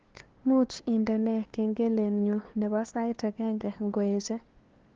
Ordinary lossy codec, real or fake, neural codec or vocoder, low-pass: Opus, 16 kbps; fake; codec, 16 kHz, 2 kbps, FunCodec, trained on LibriTTS, 25 frames a second; 7.2 kHz